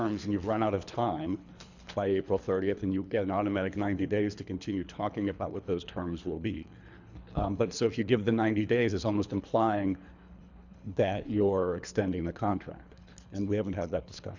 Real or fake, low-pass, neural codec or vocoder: fake; 7.2 kHz; codec, 24 kHz, 3 kbps, HILCodec